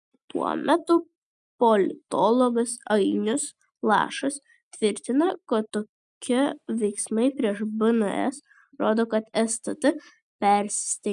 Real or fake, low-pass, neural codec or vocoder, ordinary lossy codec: real; 10.8 kHz; none; MP3, 96 kbps